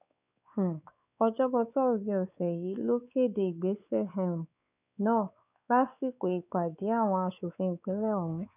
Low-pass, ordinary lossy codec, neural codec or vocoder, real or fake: 3.6 kHz; none; codec, 16 kHz, 4 kbps, X-Codec, WavLM features, trained on Multilingual LibriSpeech; fake